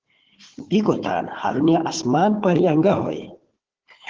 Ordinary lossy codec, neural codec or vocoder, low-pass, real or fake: Opus, 16 kbps; codec, 16 kHz, 4 kbps, FunCodec, trained on Chinese and English, 50 frames a second; 7.2 kHz; fake